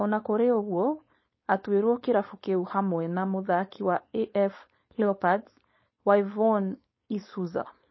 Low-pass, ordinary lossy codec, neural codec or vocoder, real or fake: 7.2 kHz; MP3, 24 kbps; codec, 16 kHz, 4.8 kbps, FACodec; fake